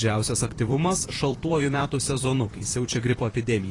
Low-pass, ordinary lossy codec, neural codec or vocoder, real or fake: 10.8 kHz; AAC, 32 kbps; vocoder, 44.1 kHz, 128 mel bands, Pupu-Vocoder; fake